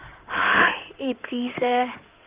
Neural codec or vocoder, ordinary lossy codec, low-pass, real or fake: codec, 16 kHz in and 24 kHz out, 2.2 kbps, FireRedTTS-2 codec; Opus, 24 kbps; 3.6 kHz; fake